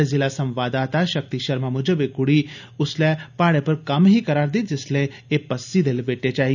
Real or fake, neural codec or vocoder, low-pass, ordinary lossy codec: real; none; 7.2 kHz; none